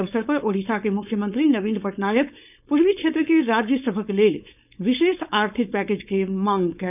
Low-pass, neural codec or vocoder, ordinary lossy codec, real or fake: 3.6 kHz; codec, 16 kHz, 4.8 kbps, FACodec; none; fake